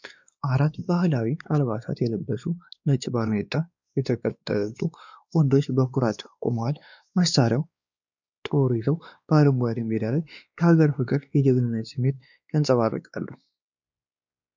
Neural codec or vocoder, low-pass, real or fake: codec, 16 kHz, 2 kbps, X-Codec, WavLM features, trained on Multilingual LibriSpeech; 7.2 kHz; fake